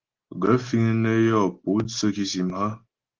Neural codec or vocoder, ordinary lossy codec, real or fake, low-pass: none; Opus, 16 kbps; real; 7.2 kHz